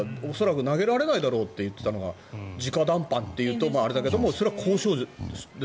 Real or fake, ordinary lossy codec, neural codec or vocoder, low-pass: real; none; none; none